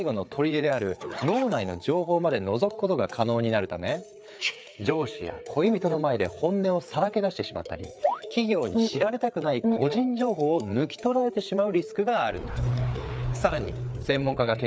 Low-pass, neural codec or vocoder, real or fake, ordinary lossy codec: none; codec, 16 kHz, 4 kbps, FreqCodec, larger model; fake; none